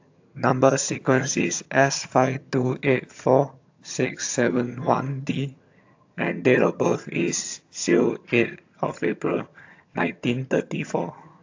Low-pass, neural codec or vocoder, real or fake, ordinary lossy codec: 7.2 kHz; vocoder, 22.05 kHz, 80 mel bands, HiFi-GAN; fake; AAC, 48 kbps